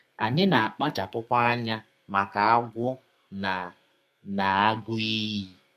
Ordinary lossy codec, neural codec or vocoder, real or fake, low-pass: MP3, 64 kbps; codec, 32 kHz, 1.9 kbps, SNAC; fake; 14.4 kHz